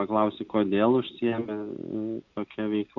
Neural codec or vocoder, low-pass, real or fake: none; 7.2 kHz; real